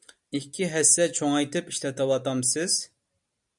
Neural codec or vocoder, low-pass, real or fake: none; 10.8 kHz; real